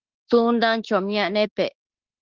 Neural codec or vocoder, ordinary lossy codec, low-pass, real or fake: autoencoder, 48 kHz, 32 numbers a frame, DAC-VAE, trained on Japanese speech; Opus, 16 kbps; 7.2 kHz; fake